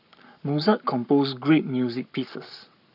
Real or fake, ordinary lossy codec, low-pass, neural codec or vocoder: fake; none; 5.4 kHz; codec, 44.1 kHz, 7.8 kbps, Pupu-Codec